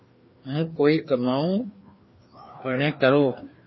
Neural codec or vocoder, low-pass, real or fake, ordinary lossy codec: codec, 16 kHz, 2 kbps, FreqCodec, larger model; 7.2 kHz; fake; MP3, 24 kbps